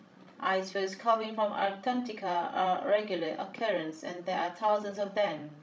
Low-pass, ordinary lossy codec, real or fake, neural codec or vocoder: none; none; fake; codec, 16 kHz, 16 kbps, FreqCodec, larger model